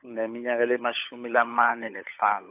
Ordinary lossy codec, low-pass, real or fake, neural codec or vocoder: AAC, 32 kbps; 3.6 kHz; fake; codec, 16 kHz, 16 kbps, FunCodec, trained on LibriTTS, 50 frames a second